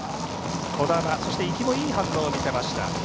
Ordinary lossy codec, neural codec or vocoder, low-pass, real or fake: none; none; none; real